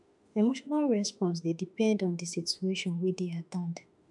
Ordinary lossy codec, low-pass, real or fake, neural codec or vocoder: none; 10.8 kHz; fake; autoencoder, 48 kHz, 32 numbers a frame, DAC-VAE, trained on Japanese speech